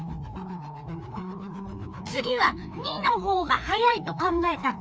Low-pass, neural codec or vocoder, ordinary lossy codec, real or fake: none; codec, 16 kHz, 2 kbps, FreqCodec, larger model; none; fake